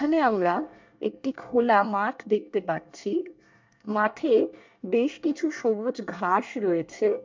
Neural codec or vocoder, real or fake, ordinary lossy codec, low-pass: codec, 24 kHz, 1 kbps, SNAC; fake; MP3, 64 kbps; 7.2 kHz